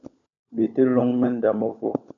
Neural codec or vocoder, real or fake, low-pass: codec, 16 kHz, 16 kbps, FunCodec, trained on LibriTTS, 50 frames a second; fake; 7.2 kHz